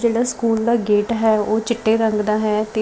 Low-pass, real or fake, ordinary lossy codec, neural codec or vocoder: none; real; none; none